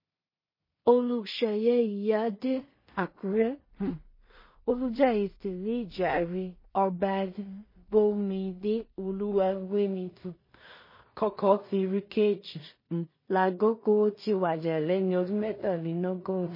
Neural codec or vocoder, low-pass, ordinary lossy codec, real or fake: codec, 16 kHz in and 24 kHz out, 0.4 kbps, LongCat-Audio-Codec, two codebook decoder; 5.4 kHz; MP3, 24 kbps; fake